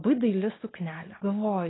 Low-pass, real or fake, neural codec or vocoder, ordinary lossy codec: 7.2 kHz; real; none; AAC, 16 kbps